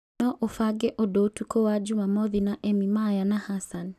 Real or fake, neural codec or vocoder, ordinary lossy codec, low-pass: real; none; none; 14.4 kHz